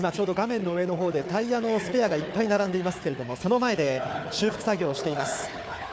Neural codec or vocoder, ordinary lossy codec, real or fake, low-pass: codec, 16 kHz, 4 kbps, FunCodec, trained on Chinese and English, 50 frames a second; none; fake; none